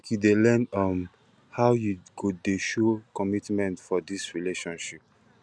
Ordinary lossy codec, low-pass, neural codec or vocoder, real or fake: none; none; none; real